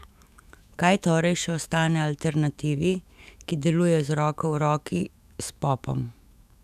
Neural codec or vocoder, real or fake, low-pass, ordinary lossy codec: autoencoder, 48 kHz, 128 numbers a frame, DAC-VAE, trained on Japanese speech; fake; 14.4 kHz; none